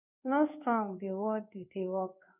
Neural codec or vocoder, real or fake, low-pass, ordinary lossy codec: codec, 16 kHz in and 24 kHz out, 1 kbps, XY-Tokenizer; fake; 3.6 kHz; none